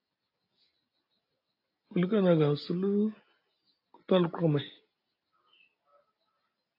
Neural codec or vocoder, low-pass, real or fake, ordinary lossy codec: none; 5.4 kHz; real; AAC, 32 kbps